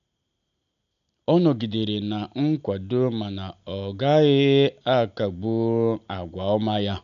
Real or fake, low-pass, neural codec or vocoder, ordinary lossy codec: real; 7.2 kHz; none; MP3, 96 kbps